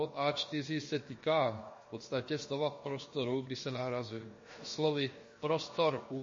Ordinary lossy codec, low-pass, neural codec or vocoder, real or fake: MP3, 32 kbps; 7.2 kHz; codec, 16 kHz, about 1 kbps, DyCAST, with the encoder's durations; fake